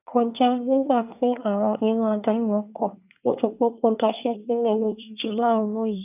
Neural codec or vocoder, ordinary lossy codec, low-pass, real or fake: codec, 24 kHz, 1 kbps, SNAC; none; 3.6 kHz; fake